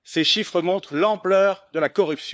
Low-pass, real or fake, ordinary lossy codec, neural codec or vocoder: none; fake; none; codec, 16 kHz, 4 kbps, FunCodec, trained on LibriTTS, 50 frames a second